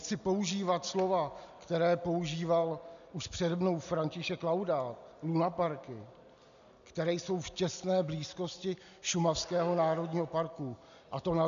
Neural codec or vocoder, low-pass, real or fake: none; 7.2 kHz; real